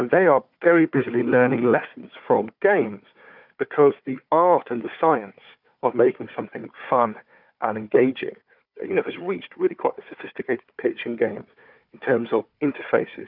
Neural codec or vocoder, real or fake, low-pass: codec, 16 kHz, 4 kbps, FunCodec, trained on Chinese and English, 50 frames a second; fake; 5.4 kHz